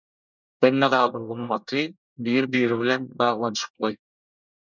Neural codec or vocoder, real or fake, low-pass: codec, 24 kHz, 1 kbps, SNAC; fake; 7.2 kHz